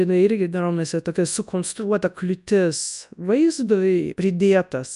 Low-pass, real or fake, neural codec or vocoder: 10.8 kHz; fake; codec, 24 kHz, 0.9 kbps, WavTokenizer, large speech release